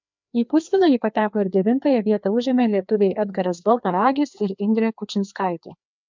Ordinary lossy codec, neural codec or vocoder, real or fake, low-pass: MP3, 64 kbps; codec, 16 kHz, 2 kbps, FreqCodec, larger model; fake; 7.2 kHz